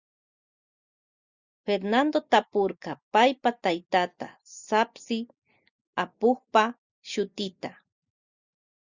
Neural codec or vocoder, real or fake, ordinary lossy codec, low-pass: none; real; Opus, 64 kbps; 7.2 kHz